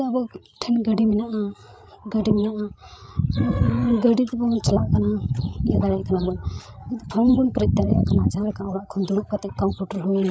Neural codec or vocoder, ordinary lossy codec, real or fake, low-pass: codec, 16 kHz, 8 kbps, FreqCodec, larger model; none; fake; none